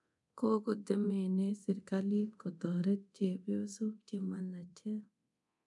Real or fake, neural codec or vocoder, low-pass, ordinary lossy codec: fake; codec, 24 kHz, 0.9 kbps, DualCodec; 10.8 kHz; none